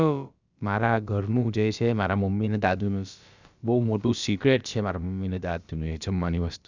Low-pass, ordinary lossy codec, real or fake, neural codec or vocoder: 7.2 kHz; Opus, 64 kbps; fake; codec, 16 kHz, about 1 kbps, DyCAST, with the encoder's durations